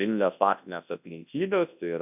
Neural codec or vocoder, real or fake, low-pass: codec, 24 kHz, 0.9 kbps, WavTokenizer, large speech release; fake; 3.6 kHz